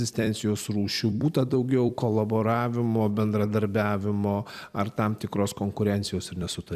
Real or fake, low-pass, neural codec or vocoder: fake; 14.4 kHz; vocoder, 44.1 kHz, 128 mel bands every 256 samples, BigVGAN v2